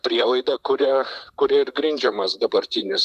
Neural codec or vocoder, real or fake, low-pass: vocoder, 44.1 kHz, 128 mel bands, Pupu-Vocoder; fake; 14.4 kHz